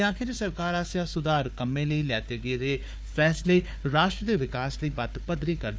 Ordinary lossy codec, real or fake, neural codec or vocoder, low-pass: none; fake; codec, 16 kHz, 4 kbps, FunCodec, trained on Chinese and English, 50 frames a second; none